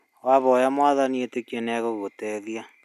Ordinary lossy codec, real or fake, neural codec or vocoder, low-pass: none; real; none; 14.4 kHz